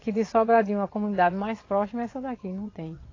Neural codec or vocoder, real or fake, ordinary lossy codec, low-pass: vocoder, 22.05 kHz, 80 mel bands, WaveNeXt; fake; AAC, 32 kbps; 7.2 kHz